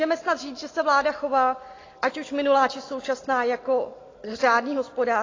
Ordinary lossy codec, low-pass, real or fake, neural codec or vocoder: AAC, 32 kbps; 7.2 kHz; real; none